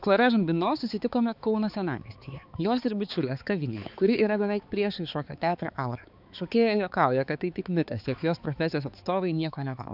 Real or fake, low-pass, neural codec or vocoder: fake; 5.4 kHz; codec, 16 kHz, 4 kbps, X-Codec, HuBERT features, trained on balanced general audio